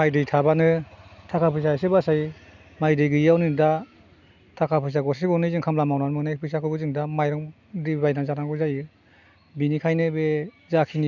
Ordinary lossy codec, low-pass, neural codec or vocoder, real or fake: none; 7.2 kHz; none; real